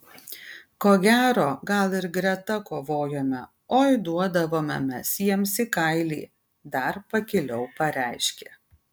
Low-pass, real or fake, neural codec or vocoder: 19.8 kHz; real; none